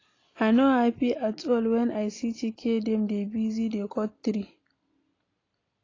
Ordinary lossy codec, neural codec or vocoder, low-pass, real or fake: AAC, 32 kbps; none; 7.2 kHz; real